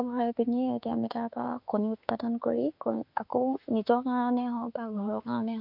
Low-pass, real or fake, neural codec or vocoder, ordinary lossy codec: 5.4 kHz; fake; autoencoder, 48 kHz, 32 numbers a frame, DAC-VAE, trained on Japanese speech; none